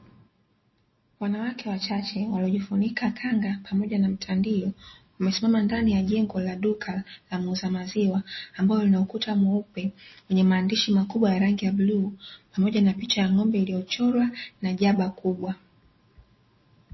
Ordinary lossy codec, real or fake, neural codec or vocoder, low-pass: MP3, 24 kbps; real; none; 7.2 kHz